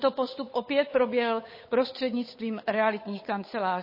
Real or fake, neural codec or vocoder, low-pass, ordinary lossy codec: fake; codec, 16 kHz, 8 kbps, FunCodec, trained on Chinese and English, 25 frames a second; 5.4 kHz; MP3, 24 kbps